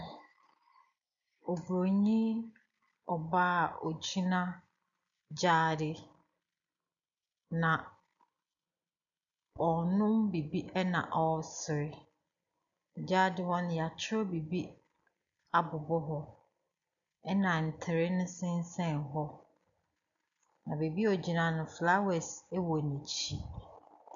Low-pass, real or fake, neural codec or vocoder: 7.2 kHz; real; none